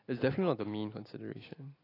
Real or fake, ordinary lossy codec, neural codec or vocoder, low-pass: real; AAC, 24 kbps; none; 5.4 kHz